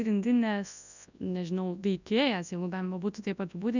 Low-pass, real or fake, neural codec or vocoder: 7.2 kHz; fake; codec, 24 kHz, 0.9 kbps, WavTokenizer, large speech release